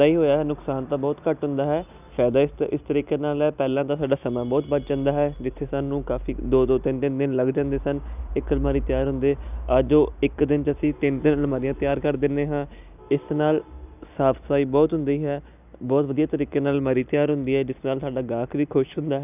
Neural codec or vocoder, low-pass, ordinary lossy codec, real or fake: none; 3.6 kHz; none; real